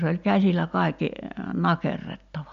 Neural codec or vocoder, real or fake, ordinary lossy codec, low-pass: none; real; none; 7.2 kHz